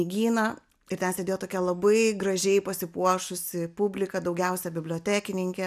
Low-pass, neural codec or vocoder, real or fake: 14.4 kHz; none; real